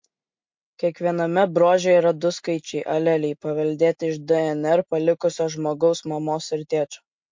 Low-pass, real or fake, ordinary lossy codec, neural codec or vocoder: 7.2 kHz; real; MP3, 48 kbps; none